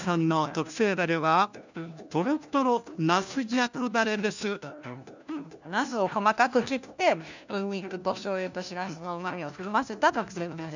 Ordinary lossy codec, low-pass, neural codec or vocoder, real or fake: none; 7.2 kHz; codec, 16 kHz, 1 kbps, FunCodec, trained on LibriTTS, 50 frames a second; fake